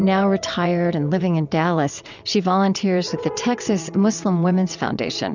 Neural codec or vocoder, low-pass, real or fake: vocoder, 22.05 kHz, 80 mel bands, WaveNeXt; 7.2 kHz; fake